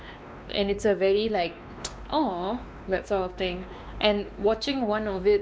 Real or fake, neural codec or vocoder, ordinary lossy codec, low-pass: fake; codec, 16 kHz, 2 kbps, X-Codec, WavLM features, trained on Multilingual LibriSpeech; none; none